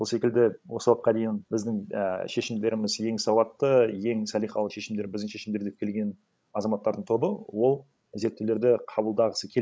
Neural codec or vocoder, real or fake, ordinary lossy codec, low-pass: codec, 16 kHz, 16 kbps, FreqCodec, larger model; fake; none; none